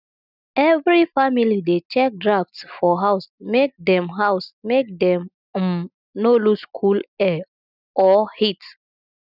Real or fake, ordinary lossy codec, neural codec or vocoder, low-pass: real; none; none; 5.4 kHz